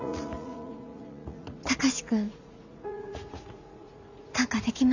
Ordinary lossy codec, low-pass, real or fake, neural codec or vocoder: none; 7.2 kHz; fake; vocoder, 44.1 kHz, 80 mel bands, Vocos